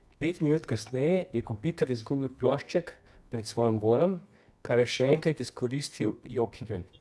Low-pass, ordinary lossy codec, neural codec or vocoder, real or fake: none; none; codec, 24 kHz, 0.9 kbps, WavTokenizer, medium music audio release; fake